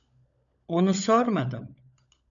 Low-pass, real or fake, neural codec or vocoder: 7.2 kHz; fake; codec, 16 kHz, 16 kbps, FunCodec, trained on LibriTTS, 50 frames a second